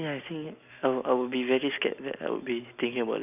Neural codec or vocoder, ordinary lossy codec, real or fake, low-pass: none; none; real; 3.6 kHz